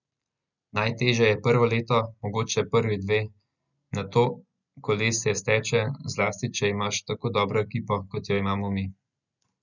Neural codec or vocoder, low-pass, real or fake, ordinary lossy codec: none; 7.2 kHz; real; none